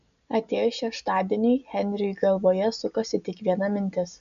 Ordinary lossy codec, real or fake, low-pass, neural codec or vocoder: AAC, 96 kbps; real; 7.2 kHz; none